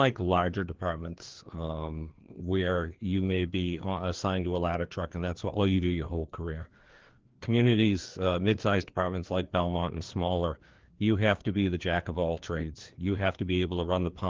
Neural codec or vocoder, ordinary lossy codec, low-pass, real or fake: codec, 16 kHz, 2 kbps, FreqCodec, larger model; Opus, 16 kbps; 7.2 kHz; fake